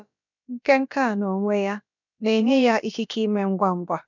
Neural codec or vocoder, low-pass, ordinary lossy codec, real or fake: codec, 16 kHz, about 1 kbps, DyCAST, with the encoder's durations; 7.2 kHz; none; fake